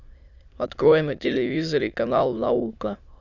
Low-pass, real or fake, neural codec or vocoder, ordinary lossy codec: 7.2 kHz; fake; autoencoder, 22.05 kHz, a latent of 192 numbers a frame, VITS, trained on many speakers; Opus, 64 kbps